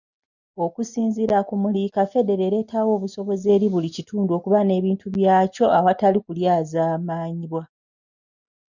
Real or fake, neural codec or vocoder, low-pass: real; none; 7.2 kHz